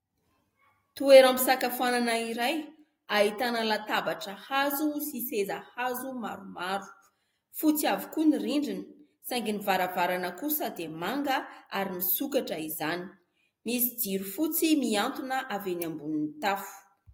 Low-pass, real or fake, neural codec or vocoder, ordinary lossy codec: 19.8 kHz; real; none; AAC, 48 kbps